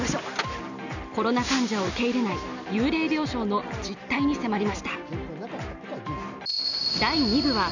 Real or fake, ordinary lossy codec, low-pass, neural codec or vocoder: real; none; 7.2 kHz; none